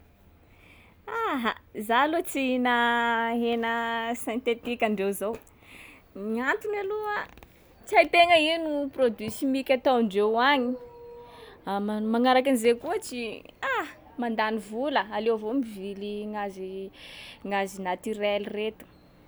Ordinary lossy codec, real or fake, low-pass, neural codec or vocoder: none; real; none; none